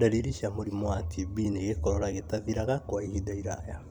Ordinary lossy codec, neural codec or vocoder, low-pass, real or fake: none; vocoder, 44.1 kHz, 128 mel bands every 256 samples, BigVGAN v2; 19.8 kHz; fake